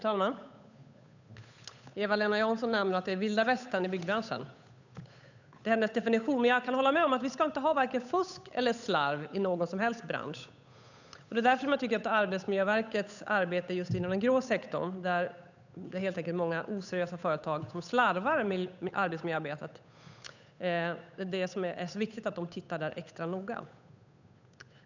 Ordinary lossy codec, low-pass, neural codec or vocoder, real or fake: none; 7.2 kHz; codec, 16 kHz, 8 kbps, FunCodec, trained on Chinese and English, 25 frames a second; fake